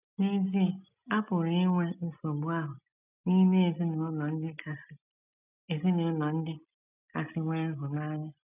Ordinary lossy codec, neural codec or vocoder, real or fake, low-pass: none; none; real; 3.6 kHz